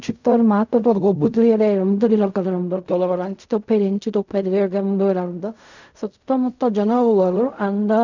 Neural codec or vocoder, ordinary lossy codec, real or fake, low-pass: codec, 16 kHz in and 24 kHz out, 0.4 kbps, LongCat-Audio-Codec, fine tuned four codebook decoder; none; fake; 7.2 kHz